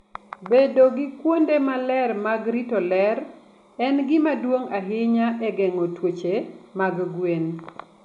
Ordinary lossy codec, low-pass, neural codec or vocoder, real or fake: none; 10.8 kHz; none; real